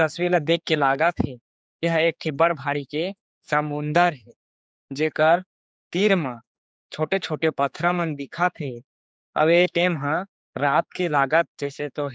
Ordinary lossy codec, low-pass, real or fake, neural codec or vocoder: none; none; fake; codec, 16 kHz, 4 kbps, X-Codec, HuBERT features, trained on general audio